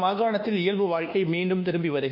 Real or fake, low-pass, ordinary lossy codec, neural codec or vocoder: fake; 5.4 kHz; none; codec, 24 kHz, 1.2 kbps, DualCodec